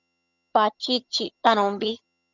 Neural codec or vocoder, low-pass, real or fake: vocoder, 22.05 kHz, 80 mel bands, HiFi-GAN; 7.2 kHz; fake